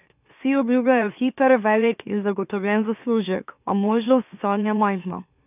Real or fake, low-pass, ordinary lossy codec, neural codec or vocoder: fake; 3.6 kHz; none; autoencoder, 44.1 kHz, a latent of 192 numbers a frame, MeloTTS